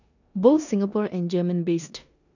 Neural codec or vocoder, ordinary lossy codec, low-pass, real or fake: codec, 16 kHz in and 24 kHz out, 0.9 kbps, LongCat-Audio-Codec, four codebook decoder; none; 7.2 kHz; fake